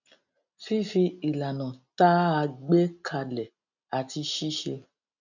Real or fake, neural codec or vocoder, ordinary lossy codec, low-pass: real; none; none; 7.2 kHz